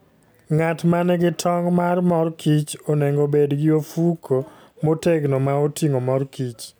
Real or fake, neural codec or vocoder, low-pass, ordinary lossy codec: real; none; none; none